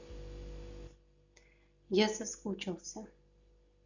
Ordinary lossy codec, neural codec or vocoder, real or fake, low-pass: none; none; real; 7.2 kHz